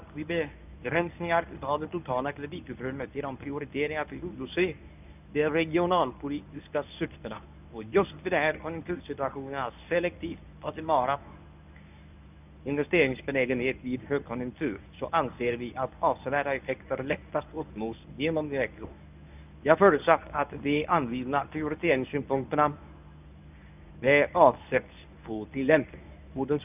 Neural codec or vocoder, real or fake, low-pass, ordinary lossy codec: codec, 24 kHz, 0.9 kbps, WavTokenizer, medium speech release version 1; fake; 3.6 kHz; none